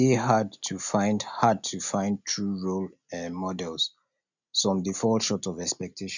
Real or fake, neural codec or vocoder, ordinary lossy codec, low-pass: real; none; none; 7.2 kHz